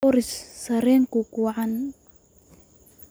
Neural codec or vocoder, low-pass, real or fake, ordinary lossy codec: none; none; real; none